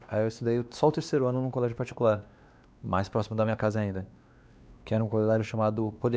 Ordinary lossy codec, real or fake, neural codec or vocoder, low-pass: none; fake; codec, 16 kHz, 2 kbps, X-Codec, WavLM features, trained on Multilingual LibriSpeech; none